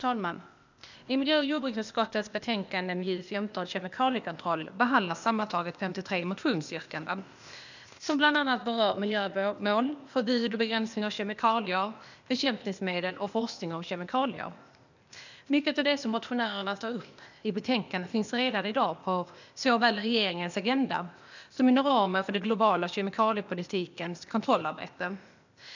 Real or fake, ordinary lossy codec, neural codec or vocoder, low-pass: fake; none; codec, 16 kHz, 0.8 kbps, ZipCodec; 7.2 kHz